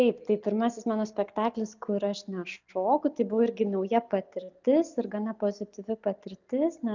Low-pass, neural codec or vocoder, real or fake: 7.2 kHz; none; real